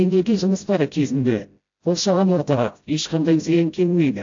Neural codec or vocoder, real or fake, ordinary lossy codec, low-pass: codec, 16 kHz, 0.5 kbps, FreqCodec, smaller model; fake; AAC, 48 kbps; 7.2 kHz